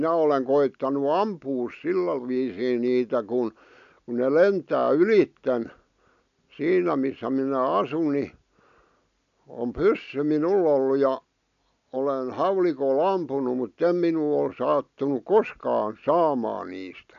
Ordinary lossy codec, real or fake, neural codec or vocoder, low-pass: none; real; none; 7.2 kHz